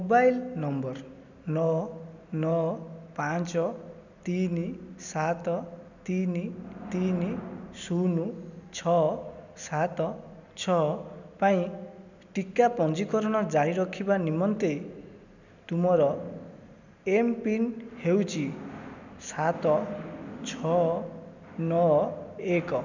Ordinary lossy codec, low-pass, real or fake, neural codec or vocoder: none; 7.2 kHz; real; none